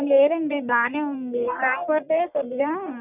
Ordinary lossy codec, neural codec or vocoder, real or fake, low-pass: none; codec, 44.1 kHz, 1.7 kbps, Pupu-Codec; fake; 3.6 kHz